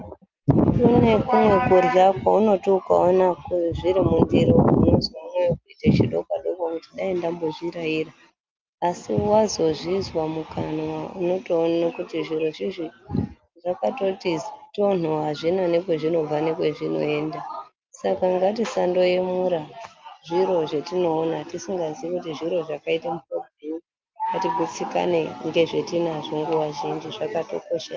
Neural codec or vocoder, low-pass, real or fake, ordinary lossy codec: none; 7.2 kHz; real; Opus, 32 kbps